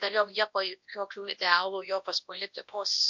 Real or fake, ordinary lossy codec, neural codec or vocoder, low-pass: fake; MP3, 48 kbps; codec, 24 kHz, 0.9 kbps, WavTokenizer, large speech release; 7.2 kHz